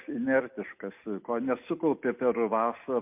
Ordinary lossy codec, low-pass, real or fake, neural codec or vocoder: MP3, 32 kbps; 3.6 kHz; real; none